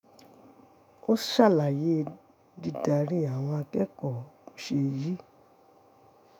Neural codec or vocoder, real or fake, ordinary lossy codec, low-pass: autoencoder, 48 kHz, 128 numbers a frame, DAC-VAE, trained on Japanese speech; fake; none; none